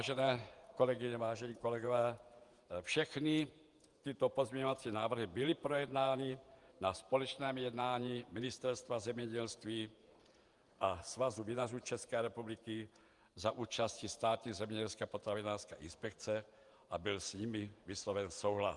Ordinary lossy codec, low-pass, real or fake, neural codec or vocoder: Opus, 32 kbps; 10.8 kHz; fake; vocoder, 48 kHz, 128 mel bands, Vocos